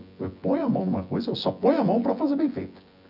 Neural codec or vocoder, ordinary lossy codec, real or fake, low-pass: vocoder, 24 kHz, 100 mel bands, Vocos; none; fake; 5.4 kHz